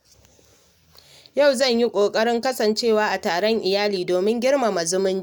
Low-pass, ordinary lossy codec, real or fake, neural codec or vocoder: none; none; real; none